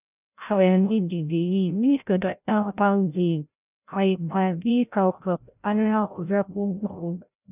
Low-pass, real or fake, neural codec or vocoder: 3.6 kHz; fake; codec, 16 kHz, 0.5 kbps, FreqCodec, larger model